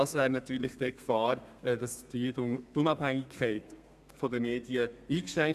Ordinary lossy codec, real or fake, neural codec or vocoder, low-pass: none; fake; codec, 32 kHz, 1.9 kbps, SNAC; 14.4 kHz